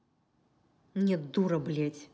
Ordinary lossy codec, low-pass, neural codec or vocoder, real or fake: none; none; none; real